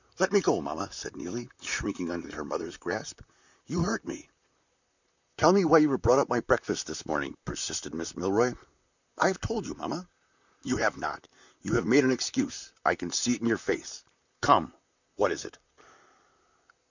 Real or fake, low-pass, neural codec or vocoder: fake; 7.2 kHz; vocoder, 44.1 kHz, 128 mel bands, Pupu-Vocoder